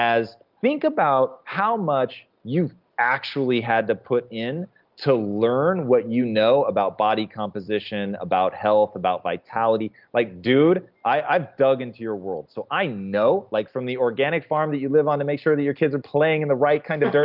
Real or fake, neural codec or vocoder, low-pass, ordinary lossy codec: real; none; 5.4 kHz; Opus, 24 kbps